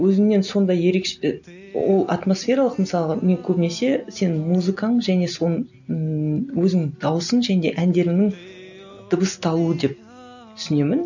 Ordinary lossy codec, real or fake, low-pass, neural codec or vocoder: none; real; 7.2 kHz; none